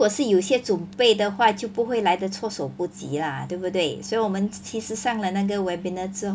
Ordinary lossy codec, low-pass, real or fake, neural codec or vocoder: none; none; real; none